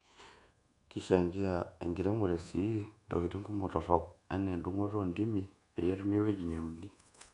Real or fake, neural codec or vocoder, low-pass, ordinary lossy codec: fake; codec, 24 kHz, 1.2 kbps, DualCodec; 10.8 kHz; none